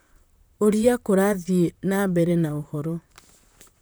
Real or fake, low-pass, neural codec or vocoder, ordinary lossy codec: fake; none; vocoder, 44.1 kHz, 128 mel bands, Pupu-Vocoder; none